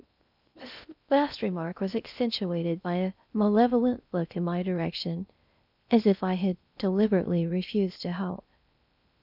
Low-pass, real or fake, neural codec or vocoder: 5.4 kHz; fake; codec, 16 kHz in and 24 kHz out, 0.6 kbps, FocalCodec, streaming, 2048 codes